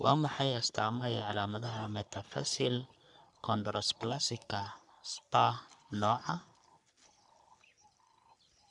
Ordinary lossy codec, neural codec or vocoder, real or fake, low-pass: none; codec, 44.1 kHz, 3.4 kbps, Pupu-Codec; fake; 10.8 kHz